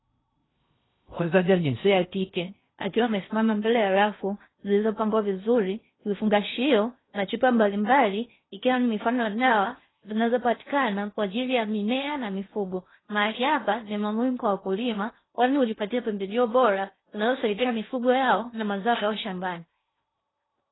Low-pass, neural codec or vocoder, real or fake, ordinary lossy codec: 7.2 kHz; codec, 16 kHz in and 24 kHz out, 0.6 kbps, FocalCodec, streaming, 2048 codes; fake; AAC, 16 kbps